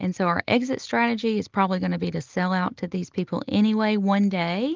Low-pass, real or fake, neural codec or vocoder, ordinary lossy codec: 7.2 kHz; real; none; Opus, 24 kbps